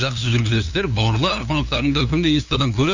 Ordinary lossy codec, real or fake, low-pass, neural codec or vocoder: Opus, 64 kbps; fake; 7.2 kHz; codec, 16 kHz, 2 kbps, FunCodec, trained on LibriTTS, 25 frames a second